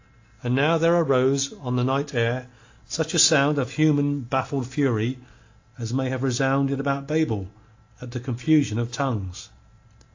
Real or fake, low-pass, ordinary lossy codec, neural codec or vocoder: real; 7.2 kHz; AAC, 48 kbps; none